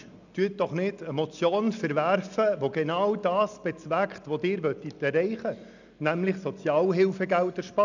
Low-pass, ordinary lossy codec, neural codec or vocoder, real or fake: 7.2 kHz; none; none; real